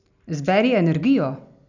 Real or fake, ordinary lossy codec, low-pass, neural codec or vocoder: real; none; 7.2 kHz; none